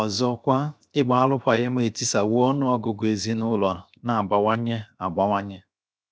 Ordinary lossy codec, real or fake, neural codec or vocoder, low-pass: none; fake; codec, 16 kHz, 0.7 kbps, FocalCodec; none